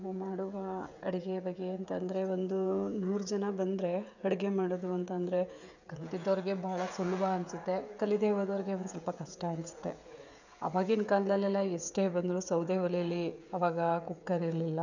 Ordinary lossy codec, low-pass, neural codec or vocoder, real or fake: none; 7.2 kHz; codec, 16 kHz, 16 kbps, FreqCodec, smaller model; fake